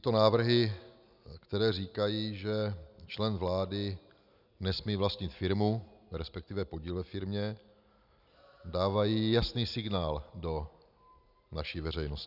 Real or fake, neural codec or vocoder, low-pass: real; none; 5.4 kHz